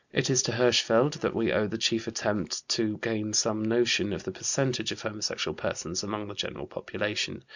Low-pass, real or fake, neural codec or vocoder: 7.2 kHz; real; none